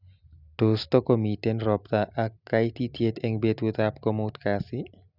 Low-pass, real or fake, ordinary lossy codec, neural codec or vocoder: 5.4 kHz; real; none; none